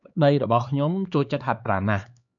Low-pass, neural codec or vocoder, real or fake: 7.2 kHz; codec, 16 kHz, 4 kbps, X-Codec, HuBERT features, trained on LibriSpeech; fake